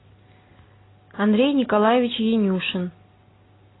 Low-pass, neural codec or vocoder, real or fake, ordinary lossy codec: 7.2 kHz; none; real; AAC, 16 kbps